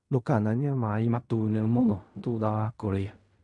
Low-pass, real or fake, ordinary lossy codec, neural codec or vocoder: 10.8 kHz; fake; none; codec, 16 kHz in and 24 kHz out, 0.4 kbps, LongCat-Audio-Codec, fine tuned four codebook decoder